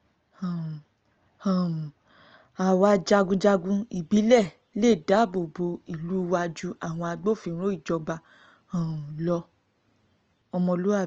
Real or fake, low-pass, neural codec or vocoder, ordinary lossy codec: real; 7.2 kHz; none; Opus, 24 kbps